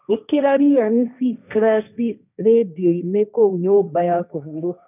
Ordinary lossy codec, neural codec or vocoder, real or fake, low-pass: none; codec, 16 kHz, 1.1 kbps, Voila-Tokenizer; fake; 3.6 kHz